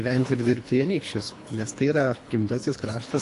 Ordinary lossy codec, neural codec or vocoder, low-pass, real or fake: AAC, 48 kbps; codec, 24 kHz, 3 kbps, HILCodec; 10.8 kHz; fake